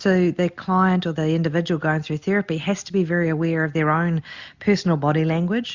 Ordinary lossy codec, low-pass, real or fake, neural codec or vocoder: Opus, 64 kbps; 7.2 kHz; real; none